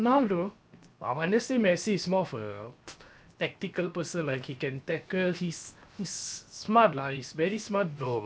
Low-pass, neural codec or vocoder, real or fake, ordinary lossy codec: none; codec, 16 kHz, 0.7 kbps, FocalCodec; fake; none